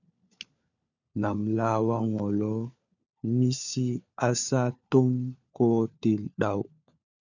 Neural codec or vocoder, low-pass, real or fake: codec, 16 kHz, 4 kbps, FunCodec, trained on LibriTTS, 50 frames a second; 7.2 kHz; fake